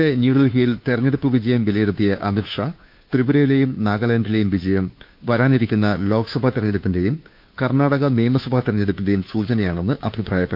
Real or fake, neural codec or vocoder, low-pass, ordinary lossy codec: fake; codec, 16 kHz, 2 kbps, FunCodec, trained on Chinese and English, 25 frames a second; 5.4 kHz; MP3, 48 kbps